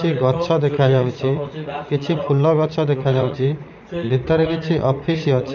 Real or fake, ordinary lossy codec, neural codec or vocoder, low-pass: fake; none; vocoder, 44.1 kHz, 80 mel bands, Vocos; 7.2 kHz